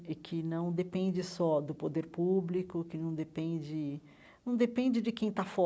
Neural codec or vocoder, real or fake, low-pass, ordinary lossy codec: none; real; none; none